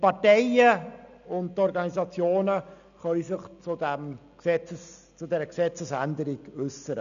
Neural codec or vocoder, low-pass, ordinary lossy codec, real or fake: none; 7.2 kHz; none; real